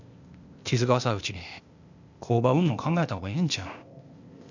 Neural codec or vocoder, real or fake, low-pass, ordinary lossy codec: codec, 16 kHz, 0.8 kbps, ZipCodec; fake; 7.2 kHz; none